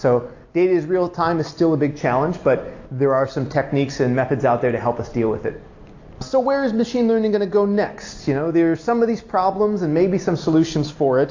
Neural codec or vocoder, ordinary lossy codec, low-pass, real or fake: none; AAC, 48 kbps; 7.2 kHz; real